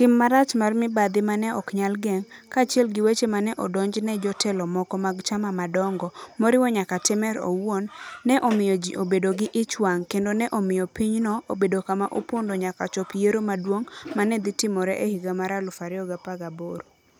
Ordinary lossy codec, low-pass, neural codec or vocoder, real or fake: none; none; none; real